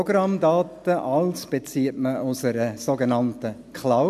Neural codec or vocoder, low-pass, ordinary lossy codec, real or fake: none; 14.4 kHz; none; real